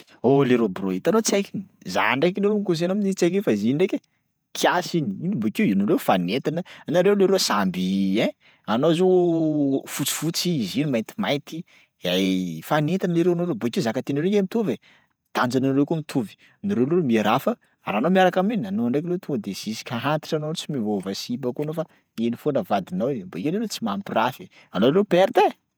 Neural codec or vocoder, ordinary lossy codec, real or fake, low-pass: vocoder, 48 kHz, 128 mel bands, Vocos; none; fake; none